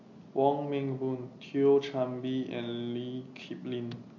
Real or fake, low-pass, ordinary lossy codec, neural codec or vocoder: real; 7.2 kHz; MP3, 48 kbps; none